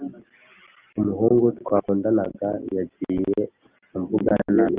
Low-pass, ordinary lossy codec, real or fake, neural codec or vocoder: 3.6 kHz; Opus, 32 kbps; real; none